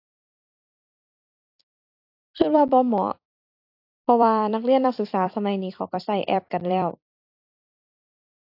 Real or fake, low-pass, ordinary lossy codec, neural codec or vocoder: fake; 5.4 kHz; AAC, 32 kbps; vocoder, 44.1 kHz, 128 mel bands every 256 samples, BigVGAN v2